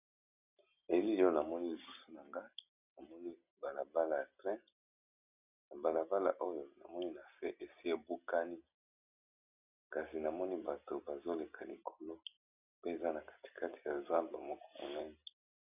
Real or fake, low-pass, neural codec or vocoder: real; 3.6 kHz; none